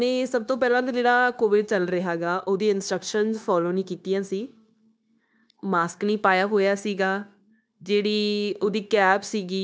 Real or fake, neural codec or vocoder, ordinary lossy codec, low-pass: fake; codec, 16 kHz, 0.9 kbps, LongCat-Audio-Codec; none; none